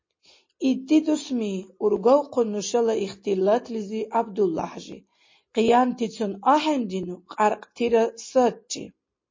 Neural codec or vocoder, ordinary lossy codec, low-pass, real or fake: none; MP3, 32 kbps; 7.2 kHz; real